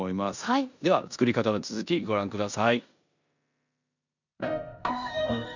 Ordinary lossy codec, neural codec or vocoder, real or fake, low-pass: none; codec, 16 kHz in and 24 kHz out, 0.9 kbps, LongCat-Audio-Codec, four codebook decoder; fake; 7.2 kHz